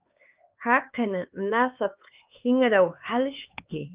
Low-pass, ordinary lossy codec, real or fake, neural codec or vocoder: 3.6 kHz; Opus, 24 kbps; fake; codec, 16 kHz, 4 kbps, X-Codec, HuBERT features, trained on LibriSpeech